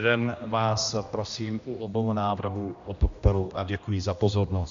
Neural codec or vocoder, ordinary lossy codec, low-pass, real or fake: codec, 16 kHz, 1 kbps, X-Codec, HuBERT features, trained on general audio; MP3, 64 kbps; 7.2 kHz; fake